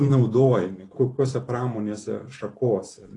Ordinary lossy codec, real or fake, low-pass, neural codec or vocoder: AAC, 32 kbps; fake; 10.8 kHz; vocoder, 44.1 kHz, 128 mel bands every 512 samples, BigVGAN v2